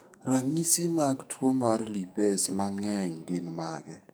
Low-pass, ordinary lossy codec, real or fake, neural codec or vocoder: none; none; fake; codec, 44.1 kHz, 2.6 kbps, SNAC